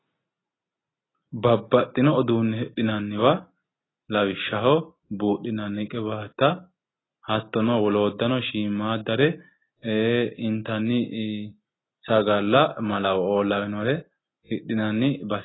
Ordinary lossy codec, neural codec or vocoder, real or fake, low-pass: AAC, 16 kbps; none; real; 7.2 kHz